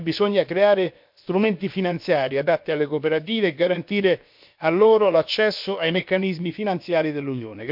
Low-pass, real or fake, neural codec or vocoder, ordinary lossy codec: 5.4 kHz; fake; codec, 16 kHz, about 1 kbps, DyCAST, with the encoder's durations; MP3, 48 kbps